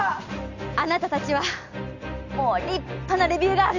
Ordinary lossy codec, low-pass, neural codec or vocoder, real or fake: none; 7.2 kHz; none; real